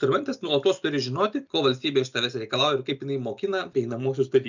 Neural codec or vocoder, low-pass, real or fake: none; 7.2 kHz; real